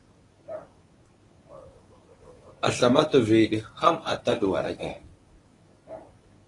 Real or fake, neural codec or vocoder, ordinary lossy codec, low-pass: fake; codec, 24 kHz, 0.9 kbps, WavTokenizer, medium speech release version 1; AAC, 32 kbps; 10.8 kHz